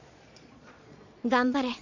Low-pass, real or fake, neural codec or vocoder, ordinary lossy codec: 7.2 kHz; fake; vocoder, 22.05 kHz, 80 mel bands, Vocos; none